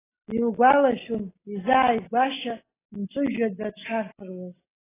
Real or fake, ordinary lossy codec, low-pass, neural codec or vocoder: real; AAC, 16 kbps; 3.6 kHz; none